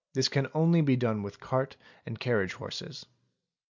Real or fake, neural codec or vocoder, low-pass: real; none; 7.2 kHz